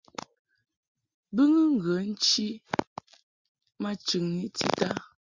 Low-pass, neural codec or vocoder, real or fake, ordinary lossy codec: 7.2 kHz; none; real; Opus, 64 kbps